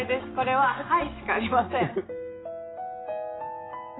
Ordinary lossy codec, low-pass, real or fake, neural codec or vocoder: AAC, 16 kbps; 7.2 kHz; real; none